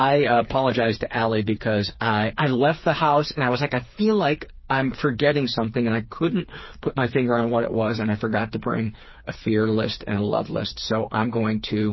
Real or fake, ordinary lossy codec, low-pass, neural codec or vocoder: fake; MP3, 24 kbps; 7.2 kHz; codec, 16 kHz, 4 kbps, FreqCodec, smaller model